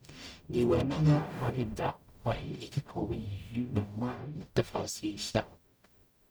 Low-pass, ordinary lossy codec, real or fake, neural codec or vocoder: none; none; fake; codec, 44.1 kHz, 0.9 kbps, DAC